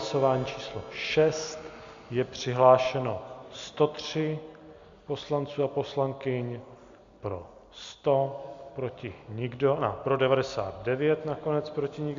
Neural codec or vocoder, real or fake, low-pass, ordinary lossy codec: none; real; 7.2 kHz; MP3, 64 kbps